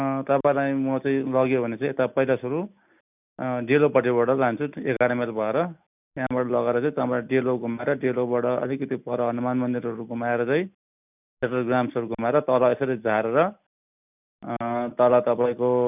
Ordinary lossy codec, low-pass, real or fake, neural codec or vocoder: none; 3.6 kHz; real; none